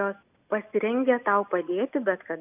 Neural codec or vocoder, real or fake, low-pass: none; real; 3.6 kHz